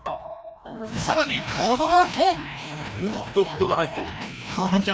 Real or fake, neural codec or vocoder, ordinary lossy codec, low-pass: fake; codec, 16 kHz, 1 kbps, FreqCodec, larger model; none; none